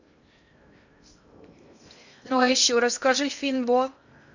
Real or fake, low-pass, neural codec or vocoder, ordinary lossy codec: fake; 7.2 kHz; codec, 16 kHz in and 24 kHz out, 0.6 kbps, FocalCodec, streaming, 2048 codes; none